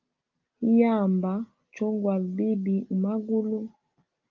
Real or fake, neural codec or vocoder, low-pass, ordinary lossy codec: real; none; 7.2 kHz; Opus, 32 kbps